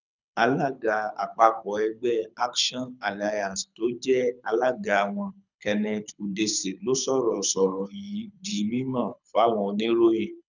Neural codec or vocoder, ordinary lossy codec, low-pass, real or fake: codec, 24 kHz, 6 kbps, HILCodec; none; 7.2 kHz; fake